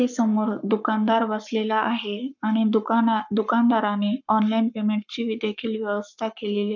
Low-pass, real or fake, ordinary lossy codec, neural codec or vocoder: 7.2 kHz; fake; none; codec, 44.1 kHz, 7.8 kbps, Pupu-Codec